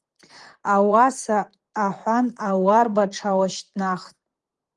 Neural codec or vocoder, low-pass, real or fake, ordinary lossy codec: vocoder, 44.1 kHz, 128 mel bands, Pupu-Vocoder; 10.8 kHz; fake; Opus, 24 kbps